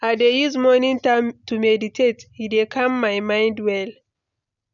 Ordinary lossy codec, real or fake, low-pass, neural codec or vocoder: none; real; 7.2 kHz; none